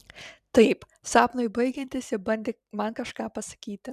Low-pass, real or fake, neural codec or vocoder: 14.4 kHz; fake; vocoder, 44.1 kHz, 128 mel bands every 256 samples, BigVGAN v2